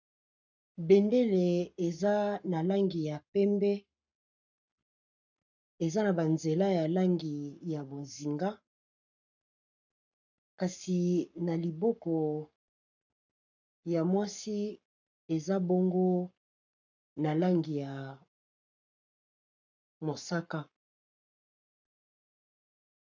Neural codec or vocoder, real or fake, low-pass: codec, 44.1 kHz, 7.8 kbps, Pupu-Codec; fake; 7.2 kHz